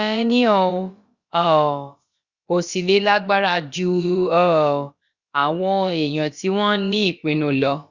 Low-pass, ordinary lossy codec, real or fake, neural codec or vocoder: 7.2 kHz; Opus, 64 kbps; fake; codec, 16 kHz, about 1 kbps, DyCAST, with the encoder's durations